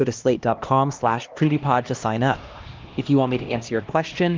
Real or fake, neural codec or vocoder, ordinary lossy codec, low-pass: fake; codec, 16 kHz, 1 kbps, X-Codec, HuBERT features, trained on LibriSpeech; Opus, 24 kbps; 7.2 kHz